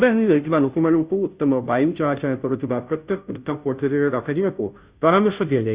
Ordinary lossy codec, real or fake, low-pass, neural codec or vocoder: Opus, 64 kbps; fake; 3.6 kHz; codec, 16 kHz, 0.5 kbps, FunCodec, trained on Chinese and English, 25 frames a second